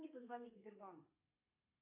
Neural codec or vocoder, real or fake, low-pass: codec, 32 kHz, 1.9 kbps, SNAC; fake; 3.6 kHz